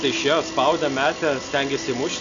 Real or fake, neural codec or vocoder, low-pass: real; none; 7.2 kHz